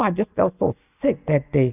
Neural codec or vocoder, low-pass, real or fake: none; 3.6 kHz; real